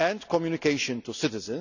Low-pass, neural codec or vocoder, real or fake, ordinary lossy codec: 7.2 kHz; none; real; none